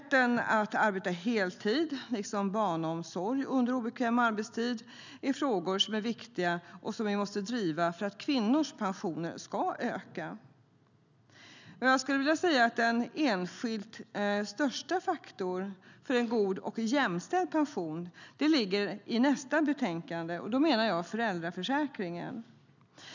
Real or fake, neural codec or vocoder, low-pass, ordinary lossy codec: real; none; 7.2 kHz; none